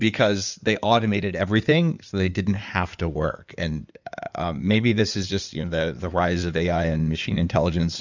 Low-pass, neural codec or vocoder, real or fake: 7.2 kHz; codec, 16 kHz in and 24 kHz out, 2.2 kbps, FireRedTTS-2 codec; fake